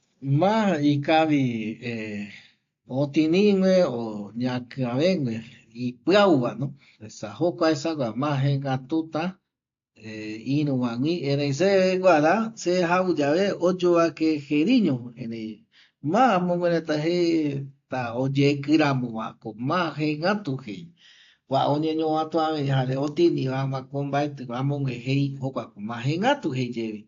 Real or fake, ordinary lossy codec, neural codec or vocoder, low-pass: real; AAC, 48 kbps; none; 7.2 kHz